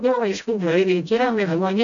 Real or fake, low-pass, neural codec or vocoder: fake; 7.2 kHz; codec, 16 kHz, 0.5 kbps, FreqCodec, smaller model